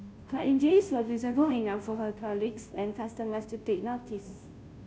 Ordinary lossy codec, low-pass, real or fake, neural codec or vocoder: none; none; fake; codec, 16 kHz, 0.5 kbps, FunCodec, trained on Chinese and English, 25 frames a second